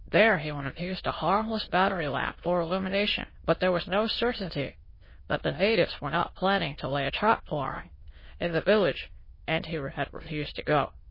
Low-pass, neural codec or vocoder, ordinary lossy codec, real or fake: 5.4 kHz; autoencoder, 22.05 kHz, a latent of 192 numbers a frame, VITS, trained on many speakers; MP3, 24 kbps; fake